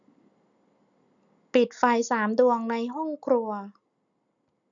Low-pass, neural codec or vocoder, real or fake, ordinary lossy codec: 7.2 kHz; none; real; none